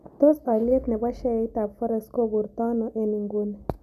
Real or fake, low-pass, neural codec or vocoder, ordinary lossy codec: real; 14.4 kHz; none; none